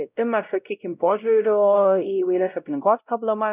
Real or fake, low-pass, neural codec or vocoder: fake; 3.6 kHz; codec, 16 kHz, 0.5 kbps, X-Codec, WavLM features, trained on Multilingual LibriSpeech